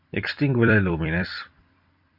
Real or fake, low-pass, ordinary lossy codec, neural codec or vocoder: fake; 5.4 kHz; MP3, 48 kbps; vocoder, 44.1 kHz, 128 mel bands every 256 samples, BigVGAN v2